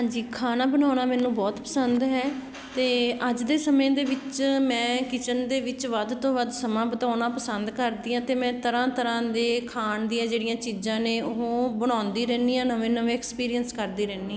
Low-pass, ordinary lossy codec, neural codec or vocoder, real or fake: none; none; none; real